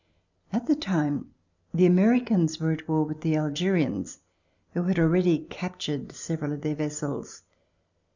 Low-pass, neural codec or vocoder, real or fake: 7.2 kHz; none; real